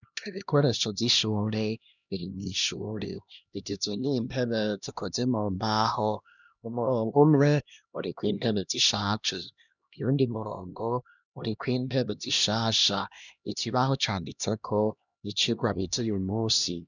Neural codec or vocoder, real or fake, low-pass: codec, 16 kHz, 1 kbps, X-Codec, HuBERT features, trained on LibriSpeech; fake; 7.2 kHz